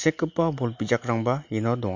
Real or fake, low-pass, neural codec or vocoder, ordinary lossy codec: real; 7.2 kHz; none; MP3, 48 kbps